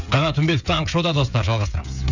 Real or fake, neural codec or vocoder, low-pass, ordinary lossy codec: fake; codec, 16 kHz, 16 kbps, FreqCodec, smaller model; 7.2 kHz; none